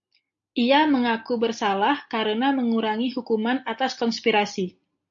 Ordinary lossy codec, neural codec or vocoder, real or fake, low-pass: AAC, 64 kbps; none; real; 7.2 kHz